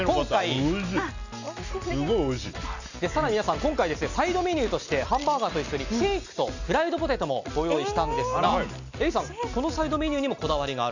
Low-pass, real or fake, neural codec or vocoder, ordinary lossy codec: 7.2 kHz; real; none; none